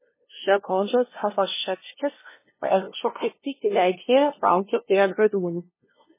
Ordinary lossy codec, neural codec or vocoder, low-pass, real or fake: MP3, 16 kbps; codec, 16 kHz, 0.5 kbps, FunCodec, trained on LibriTTS, 25 frames a second; 3.6 kHz; fake